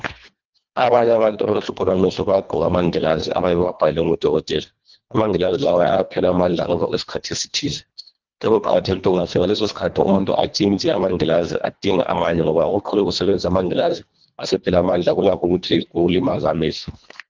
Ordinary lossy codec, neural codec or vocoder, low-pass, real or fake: Opus, 24 kbps; codec, 24 kHz, 1.5 kbps, HILCodec; 7.2 kHz; fake